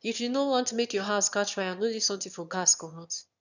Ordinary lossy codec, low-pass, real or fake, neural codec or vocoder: none; 7.2 kHz; fake; autoencoder, 22.05 kHz, a latent of 192 numbers a frame, VITS, trained on one speaker